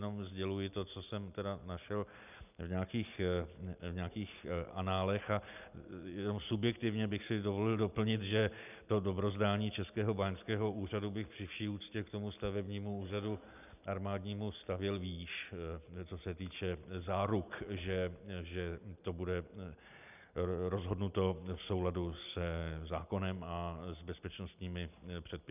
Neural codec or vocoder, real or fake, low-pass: none; real; 3.6 kHz